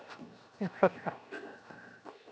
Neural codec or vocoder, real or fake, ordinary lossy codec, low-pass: codec, 16 kHz, 0.7 kbps, FocalCodec; fake; none; none